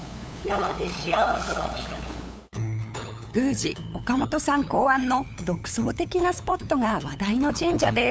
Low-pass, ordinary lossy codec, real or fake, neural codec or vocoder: none; none; fake; codec, 16 kHz, 8 kbps, FunCodec, trained on LibriTTS, 25 frames a second